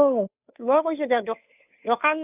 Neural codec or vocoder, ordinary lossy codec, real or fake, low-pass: codec, 16 kHz, 2 kbps, FunCodec, trained on Chinese and English, 25 frames a second; none; fake; 3.6 kHz